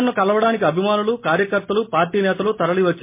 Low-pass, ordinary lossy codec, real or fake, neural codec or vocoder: 3.6 kHz; MP3, 24 kbps; real; none